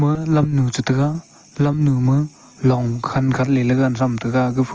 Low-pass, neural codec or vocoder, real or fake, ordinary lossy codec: 7.2 kHz; none; real; Opus, 24 kbps